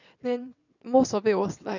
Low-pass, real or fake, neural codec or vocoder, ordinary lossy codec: 7.2 kHz; fake; vocoder, 22.05 kHz, 80 mel bands, WaveNeXt; none